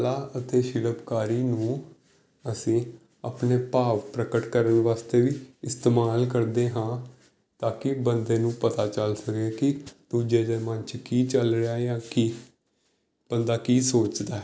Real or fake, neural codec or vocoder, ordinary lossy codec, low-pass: real; none; none; none